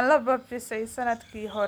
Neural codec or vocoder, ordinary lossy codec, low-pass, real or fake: none; none; none; real